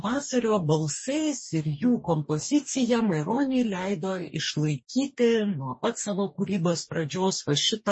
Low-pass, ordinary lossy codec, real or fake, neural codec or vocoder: 9.9 kHz; MP3, 32 kbps; fake; codec, 44.1 kHz, 2.6 kbps, DAC